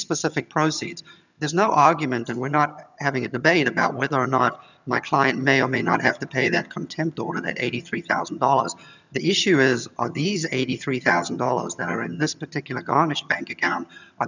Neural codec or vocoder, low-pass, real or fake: vocoder, 22.05 kHz, 80 mel bands, HiFi-GAN; 7.2 kHz; fake